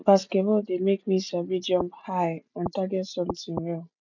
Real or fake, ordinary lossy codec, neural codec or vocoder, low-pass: real; none; none; 7.2 kHz